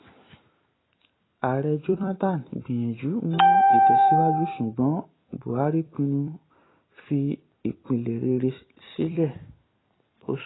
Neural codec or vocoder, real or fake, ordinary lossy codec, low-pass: vocoder, 44.1 kHz, 128 mel bands every 256 samples, BigVGAN v2; fake; AAC, 16 kbps; 7.2 kHz